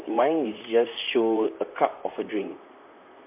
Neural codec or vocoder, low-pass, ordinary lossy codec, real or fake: vocoder, 44.1 kHz, 128 mel bands, Pupu-Vocoder; 3.6 kHz; MP3, 32 kbps; fake